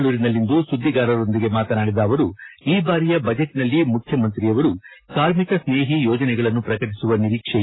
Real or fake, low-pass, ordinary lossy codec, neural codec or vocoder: real; 7.2 kHz; AAC, 16 kbps; none